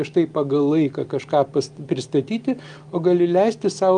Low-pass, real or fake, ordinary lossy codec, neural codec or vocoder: 9.9 kHz; real; Opus, 32 kbps; none